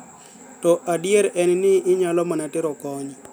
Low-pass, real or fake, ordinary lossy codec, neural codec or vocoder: none; real; none; none